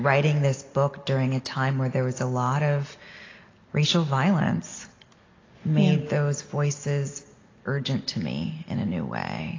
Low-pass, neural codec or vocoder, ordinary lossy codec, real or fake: 7.2 kHz; none; AAC, 32 kbps; real